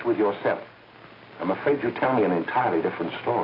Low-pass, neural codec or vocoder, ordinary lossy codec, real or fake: 5.4 kHz; none; AAC, 24 kbps; real